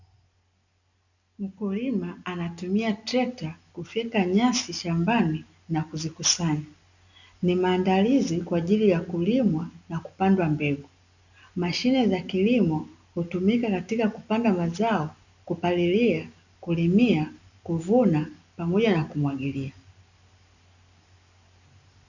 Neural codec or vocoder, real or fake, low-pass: none; real; 7.2 kHz